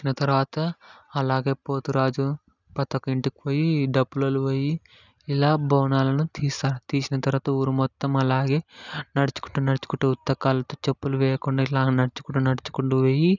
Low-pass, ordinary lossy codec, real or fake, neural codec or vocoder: 7.2 kHz; none; real; none